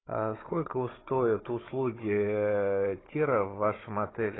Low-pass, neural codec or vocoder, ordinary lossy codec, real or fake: 7.2 kHz; codec, 16 kHz, 16 kbps, FunCodec, trained on Chinese and English, 50 frames a second; AAC, 16 kbps; fake